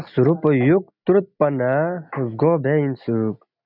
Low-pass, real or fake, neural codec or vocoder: 5.4 kHz; real; none